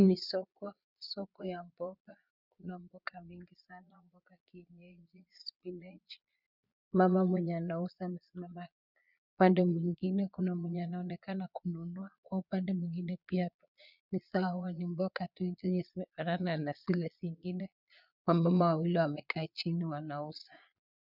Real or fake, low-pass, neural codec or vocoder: fake; 5.4 kHz; vocoder, 22.05 kHz, 80 mel bands, Vocos